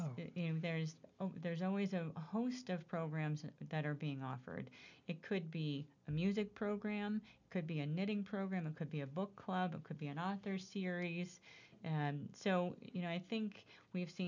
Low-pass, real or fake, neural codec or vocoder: 7.2 kHz; real; none